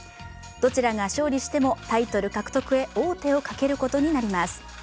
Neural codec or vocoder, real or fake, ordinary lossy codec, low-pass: none; real; none; none